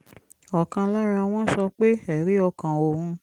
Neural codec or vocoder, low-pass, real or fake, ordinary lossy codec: none; 19.8 kHz; real; Opus, 24 kbps